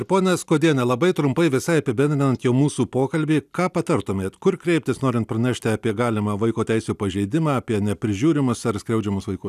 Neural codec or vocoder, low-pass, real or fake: none; 14.4 kHz; real